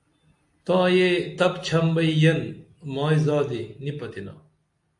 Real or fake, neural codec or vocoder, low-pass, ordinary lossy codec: real; none; 10.8 kHz; AAC, 64 kbps